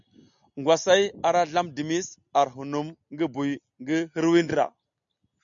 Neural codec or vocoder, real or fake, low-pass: none; real; 7.2 kHz